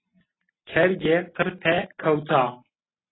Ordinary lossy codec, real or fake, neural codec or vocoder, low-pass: AAC, 16 kbps; real; none; 7.2 kHz